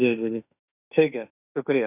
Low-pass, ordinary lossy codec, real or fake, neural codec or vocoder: 3.6 kHz; none; fake; autoencoder, 48 kHz, 32 numbers a frame, DAC-VAE, trained on Japanese speech